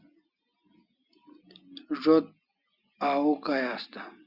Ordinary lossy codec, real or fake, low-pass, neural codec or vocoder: Opus, 64 kbps; real; 5.4 kHz; none